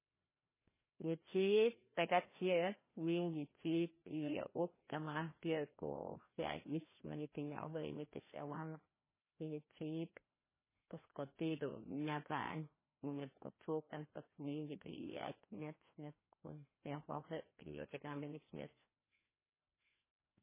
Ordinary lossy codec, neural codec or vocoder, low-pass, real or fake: MP3, 16 kbps; codec, 16 kHz, 1 kbps, FreqCodec, larger model; 3.6 kHz; fake